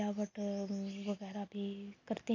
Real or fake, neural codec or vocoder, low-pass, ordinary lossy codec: real; none; 7.2 kHz; none